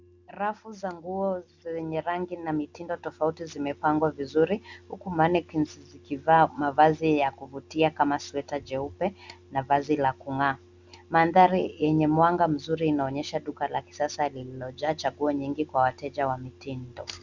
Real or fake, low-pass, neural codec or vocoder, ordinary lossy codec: real; 7.2 kHz; none; Opus, 64 kbps